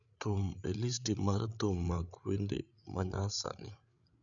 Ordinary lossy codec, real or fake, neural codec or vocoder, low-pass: none; fake; codec, 16 kHz, 8 kbps, FreqCodec, larger model; 7.2 kHz